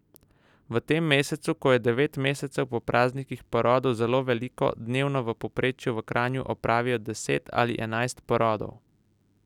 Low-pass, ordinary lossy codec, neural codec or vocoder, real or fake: 19.8 kHz; none; none; real